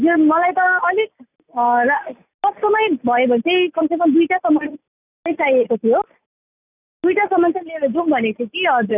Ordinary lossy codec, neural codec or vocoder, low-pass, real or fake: none; none; 3.6 kHz; real